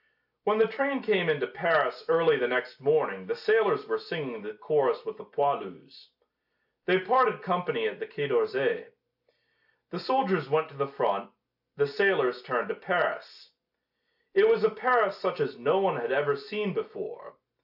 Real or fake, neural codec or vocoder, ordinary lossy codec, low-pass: real; none; AAC, 48 kbps; 5.4 kHz